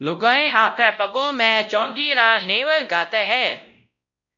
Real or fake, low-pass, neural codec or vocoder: fake; 7.2 kHz; codec, 16 kHz, 0.5 kbps, X-Codec, WavLM features, trained on Multilingual LibriSpeech